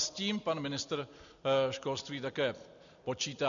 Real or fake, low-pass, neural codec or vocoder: real; 7.2 kHz; none